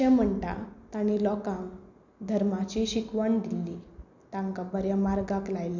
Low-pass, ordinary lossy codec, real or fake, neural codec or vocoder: 7.2 kHz; none; real; none